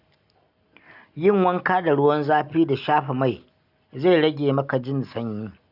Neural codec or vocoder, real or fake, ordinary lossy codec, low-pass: none; real; none; 5.4 kHz